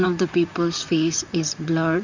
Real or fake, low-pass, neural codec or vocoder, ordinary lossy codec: fake; 7.2 kHz; vocoder, 44.1 kHz, 128 mel bands, Pupu-Vocoder; Opus, 64 kbps